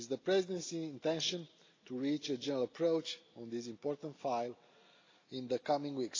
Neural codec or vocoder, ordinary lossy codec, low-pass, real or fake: none; AAC, 32 kbps; 7.2 kHz; real